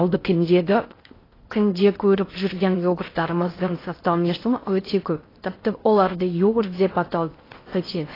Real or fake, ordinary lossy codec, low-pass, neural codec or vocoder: fake; AAC, 24 kbps; 5.4 kHz; codec, 16 kHz in and 24 kHz out, 0.6 kbps, FocalCodec, streaming, 4096 codes